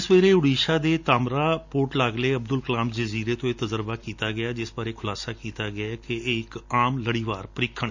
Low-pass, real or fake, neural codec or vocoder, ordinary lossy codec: 7.2 kHz; real; none; none